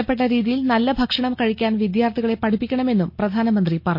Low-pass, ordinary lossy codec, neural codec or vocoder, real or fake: 5.4 kHz; MP3, 32 kbps; none; real